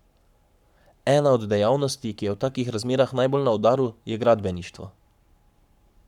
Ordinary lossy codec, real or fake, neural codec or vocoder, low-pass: none; fake; codec, 44.1 kHz, 7.8 kbps, Pupu-Codec; 19.8 kHz